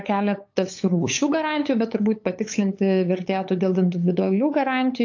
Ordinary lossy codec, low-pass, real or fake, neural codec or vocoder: AAC, 48 kbps; 7.2 kHz; fake; codec, 16 kHz, 8 kbps, FunCodec, trained on LibriTTS, 25 frames a second